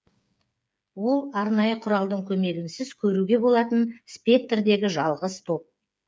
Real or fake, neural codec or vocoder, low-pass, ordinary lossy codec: fake; codec, 16 kHz, 8 kbps, FreqCodec, smaller model; none; none